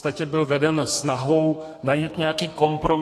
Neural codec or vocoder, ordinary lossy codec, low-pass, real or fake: codec, 32 kHz, 1.9 kbps, SNAC; AAC, 48 kbps; 14.4 kHz; fake